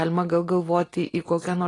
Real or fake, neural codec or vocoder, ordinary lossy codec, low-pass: real; none; AAC, 32 kbps; 10.8 kHz